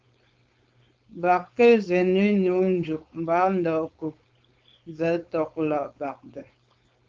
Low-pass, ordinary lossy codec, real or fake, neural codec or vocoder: 7.2 kHz; Opus, 24 kbps; fake; codec, 16 kHz, 4.8 kbps, FACodec